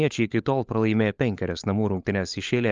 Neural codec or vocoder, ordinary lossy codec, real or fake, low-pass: codec, 16 kHz, 16 kbps, FunCodec, trained on LibriTTS, 50 frames a second; Opus, 32 kbps; fake; 7.2 kHz